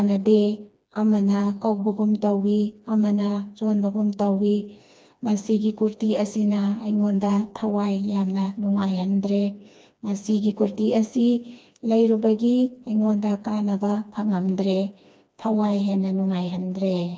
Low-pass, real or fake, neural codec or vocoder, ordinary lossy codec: none; fake; codec, 16 kHz, 2 kbps, FreqCodec, smaller model; none